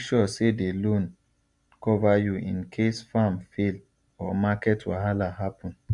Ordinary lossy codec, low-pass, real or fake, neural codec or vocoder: MP3, 64 kbps; 10.8 kHz; real; none